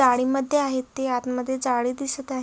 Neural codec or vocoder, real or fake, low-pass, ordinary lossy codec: none; real; none; none